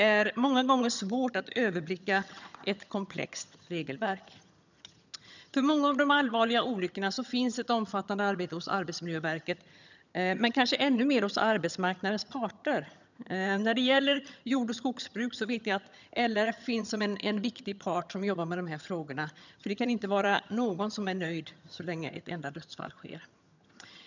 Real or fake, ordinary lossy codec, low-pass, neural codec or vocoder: fake; none; 7.2 kHz; vocoder, 22.05 kHz, 80 mel bands, HiFi-GAN